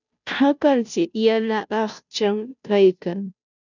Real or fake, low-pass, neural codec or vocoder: fake; 7.2 kHz; codec, 16 kHz, 0.5 kbps, FunCodec, trained on Chinese and English, 25 frames a second